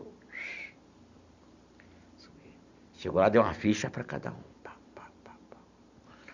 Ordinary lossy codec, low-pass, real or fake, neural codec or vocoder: Opus, 64 kbps; 7.2 kHz; real; none